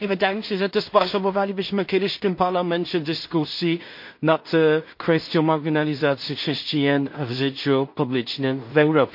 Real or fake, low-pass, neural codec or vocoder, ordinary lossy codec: fake; 5.4 kHz; codec, 16 kHz in and 24 kHz out, 0.4 kbps, LongCat-Audio-Codec, two codebook decoder; MP3, 32 kbps